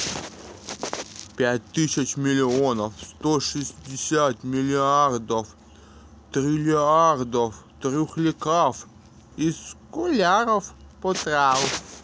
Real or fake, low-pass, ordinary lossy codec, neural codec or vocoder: real; none; none; none